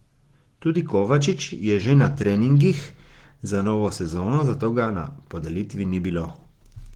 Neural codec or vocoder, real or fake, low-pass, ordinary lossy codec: codec, 44.1 kHz, 7.8 kbps, Pupu-Codec; fake; 19.8 kHz; Opus, 16 kbps